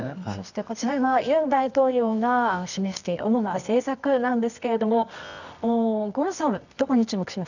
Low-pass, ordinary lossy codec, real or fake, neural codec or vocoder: 7.2 kHz; none; fake; codec, 24 kHz, 0.9 kbps, WavTokenizer, medium music audio release